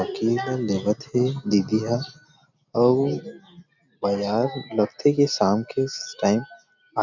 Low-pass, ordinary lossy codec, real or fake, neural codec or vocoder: 7.2 kHz; none; real; none